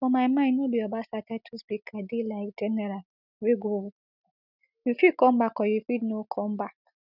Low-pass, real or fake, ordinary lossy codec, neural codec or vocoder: 5.4 kHz; real; none; none